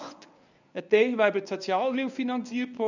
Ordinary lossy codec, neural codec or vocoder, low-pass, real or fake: none; codec, 24 kHz, 0.9 kbps, WavTokenizer, medium speech release version 1; 7.2 kHz; fake